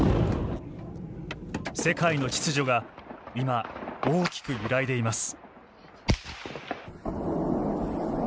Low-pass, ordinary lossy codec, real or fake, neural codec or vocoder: none; none; real; none